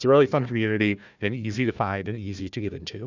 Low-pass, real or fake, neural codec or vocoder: 7.2 kHz; fake; codec, 16 kHz, 1 kbps, FunCodec, trained on Chinese and English, 50 frames a second